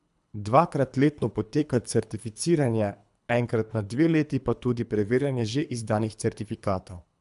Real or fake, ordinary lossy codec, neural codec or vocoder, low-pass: fake; none; codec, 24 kHz, 3 kbps, HILCodec; 10.8 kHz